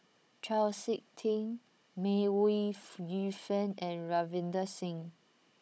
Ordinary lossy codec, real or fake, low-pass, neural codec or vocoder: none; fake; none; codec, 16 kHz, 16 kbps, FunCodec, trained on Chinese and English, 50 frames a second